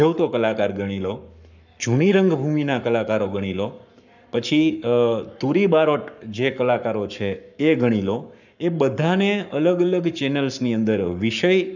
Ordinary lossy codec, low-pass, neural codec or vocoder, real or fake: none; 7.2 kHz; codec, 44.1 kHz, 7.8 kbps, Pupu-Codec; fake